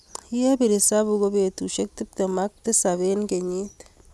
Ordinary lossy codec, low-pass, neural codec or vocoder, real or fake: none; none; none; real